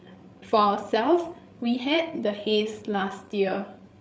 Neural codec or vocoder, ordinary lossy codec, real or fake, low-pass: codec, 16 kHz, 8 kbps, FreqCodec, larger model; none; fake; none